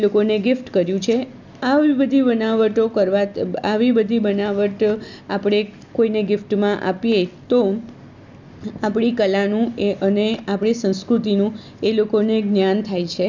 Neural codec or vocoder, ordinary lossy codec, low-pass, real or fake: none; none; 7.2 kHz; real